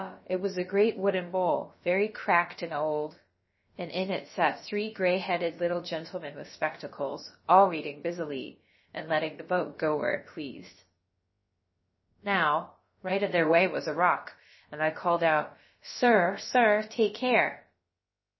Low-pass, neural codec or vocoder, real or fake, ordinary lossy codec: 7.2 kHz; codec, 16 kHz, about 1 kbps, DyCAST, with the encoder's durations; fake; MP3, 24 kbps